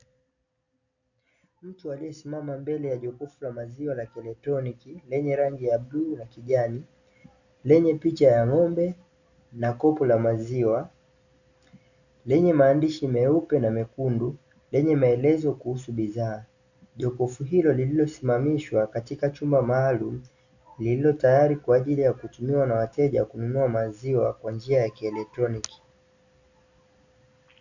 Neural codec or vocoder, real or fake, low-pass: none; real; 7.2 kHz